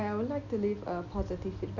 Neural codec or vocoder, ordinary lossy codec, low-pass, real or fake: none; none; 7.2 kHz; real